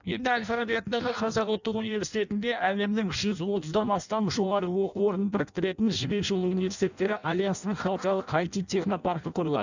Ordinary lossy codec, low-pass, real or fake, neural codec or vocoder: none; 7.2 kHz; fake; codec, 16 kHz in and 24 kHz out, 0.6 kbps, FireRedTTS-2 codec